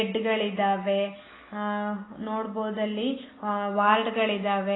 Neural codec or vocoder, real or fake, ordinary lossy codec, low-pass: none; real; AAC, 16 kbps; 7.2 kHz